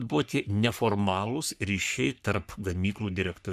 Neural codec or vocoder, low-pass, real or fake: codec, 44.1 kHz, 3.4 kbps, Pupu-Codec; 14.4 kHz; fake